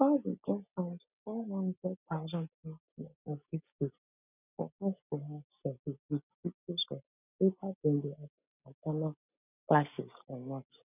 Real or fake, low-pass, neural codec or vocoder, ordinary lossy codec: real; 3.6 kHz; none; none